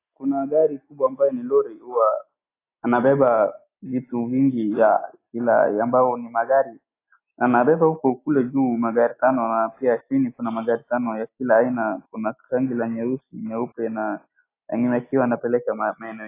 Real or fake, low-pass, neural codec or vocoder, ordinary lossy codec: real; 3.6 kHz; none; AAC, 24 kbps